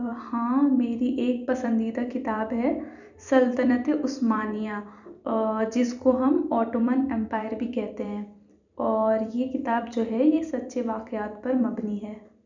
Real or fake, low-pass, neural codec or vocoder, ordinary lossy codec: fake; 7.2 kHz; vocoder, 44.1 kHz, 128 mel bands every 256 samples, BigVGAN v2; none